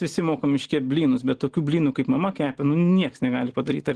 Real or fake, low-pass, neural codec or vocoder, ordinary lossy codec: real; 10.8 kHz; none; Opus, 16 kbps